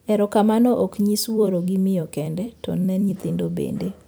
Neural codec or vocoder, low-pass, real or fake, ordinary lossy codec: vocoder, 44.1 kHz, 128 mel bands every 256 samples, BigVGAN v2; none; fake; none